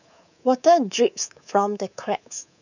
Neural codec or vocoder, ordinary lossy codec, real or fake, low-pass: codec, 24 kHz, 3.1 kbps, DualCodec; none; fake; 7.2 kHz